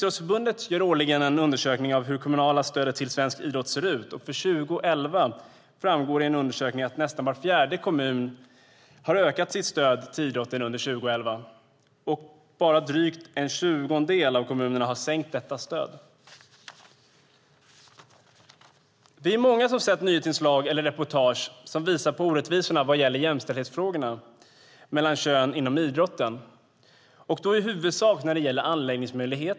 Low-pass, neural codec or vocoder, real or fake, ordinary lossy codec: none; none; real; none